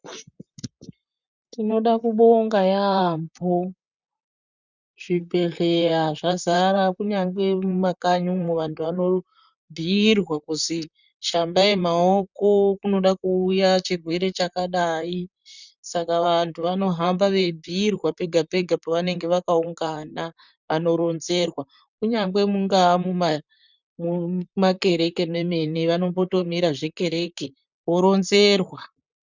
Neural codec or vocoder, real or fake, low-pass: vocoder, 44.1 kHz, 128 mel bands, Pupu-Vocoder; fake; 7.2 kHz